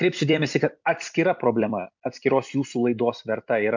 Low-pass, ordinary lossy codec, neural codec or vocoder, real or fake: 7.2 kHz; MP3, 64 kbps; vocoder, 44.1 kHz, 128 mel bands every 256 samples, BigVGAN v2; fake